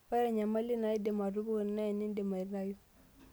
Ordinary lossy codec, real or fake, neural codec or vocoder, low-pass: none; real; none; none